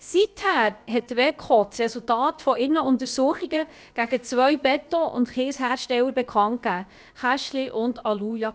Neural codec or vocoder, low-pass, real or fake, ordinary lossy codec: codec, 16 kHz, about 1 kbps, DyCAST, with the encoder's durations; none; fake; none